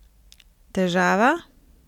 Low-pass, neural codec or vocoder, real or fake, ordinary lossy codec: 19.8 kHz; none; real; none